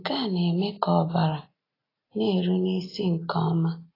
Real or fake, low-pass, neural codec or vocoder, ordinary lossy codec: real; 5.4 kHz; none; AAC, 24 kbps